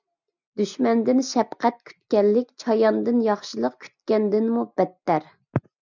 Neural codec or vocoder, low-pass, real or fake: none; 7.2 kHz; real